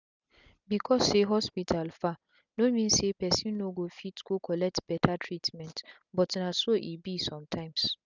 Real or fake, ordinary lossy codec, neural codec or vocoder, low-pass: real; none; none; 7.2 kHz